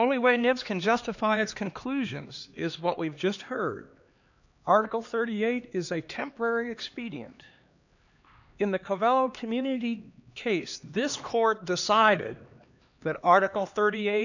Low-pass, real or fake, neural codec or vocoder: 7.2 kHz; fake; codec, 16 kHz, 2 kbps, X-Codec, HuBERT features, trained on LibriSpeech